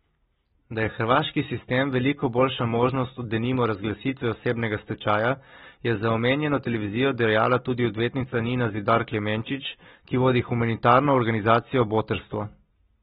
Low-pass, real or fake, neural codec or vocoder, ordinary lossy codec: 19.8 kHz; real; none; AAC, 16 kbps